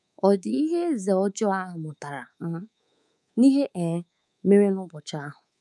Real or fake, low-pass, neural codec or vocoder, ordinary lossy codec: fake; none; codec, 24 kHz, 3.1 kbps, DualCodec; none